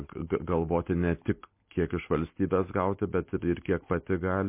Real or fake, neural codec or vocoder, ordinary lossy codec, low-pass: real; none; MP3, 24 kbps; 3.6 kHz